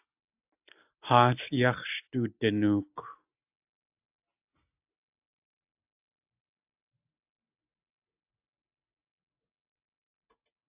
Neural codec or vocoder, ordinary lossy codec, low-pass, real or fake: none; AAC, 32 kbps; 3.6 kHz; real